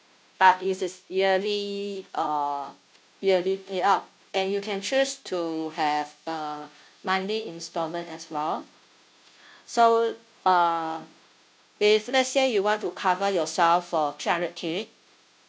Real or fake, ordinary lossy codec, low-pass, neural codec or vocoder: fake; none; none; codec, 16 kHz, 0.5 kbps, FunCodec, trained on Chinese and English, 25 frames a second